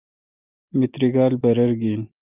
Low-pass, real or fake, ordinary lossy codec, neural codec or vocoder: 3.6 kHz; real; Opus, 32 kbps; none